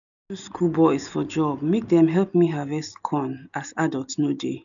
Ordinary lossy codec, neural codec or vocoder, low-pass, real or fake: none; none; 7.2 kHz; real